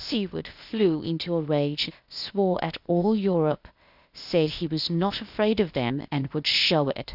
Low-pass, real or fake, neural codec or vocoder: 5.4 kHz; fake; codec, 16 kHz, 0.8 kbps, ZipCodec